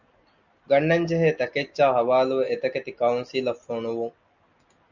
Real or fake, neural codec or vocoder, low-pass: real; none; 7.2 kHz